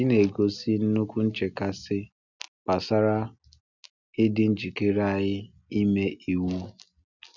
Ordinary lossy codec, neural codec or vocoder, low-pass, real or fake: none; none; 7.2 kHz; real